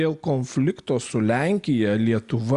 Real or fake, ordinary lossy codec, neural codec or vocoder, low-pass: real; Opus, 64 kbps; none; 10.8 kHz